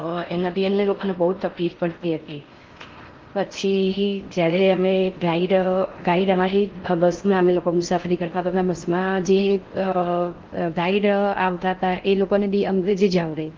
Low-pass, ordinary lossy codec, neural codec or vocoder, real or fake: 7.2 kHz; Opus, 32 kbps; codec, 16 kHz in and 24 kHz out, 0.6 kbps, FocalCodec, streaming, 4096 codes; fake